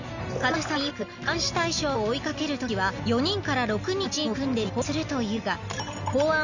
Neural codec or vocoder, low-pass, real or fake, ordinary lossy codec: none; 7.2 kHz; real; none